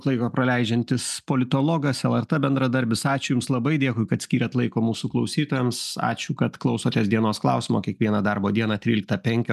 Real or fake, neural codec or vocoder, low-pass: real; none; 14.4 kHz